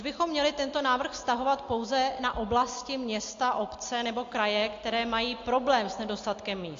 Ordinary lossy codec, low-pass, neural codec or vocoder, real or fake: AAC, 48 kbps; 7.2 kHz; none; real